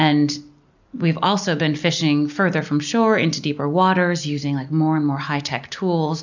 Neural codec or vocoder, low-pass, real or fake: none; 7.2 kHz; real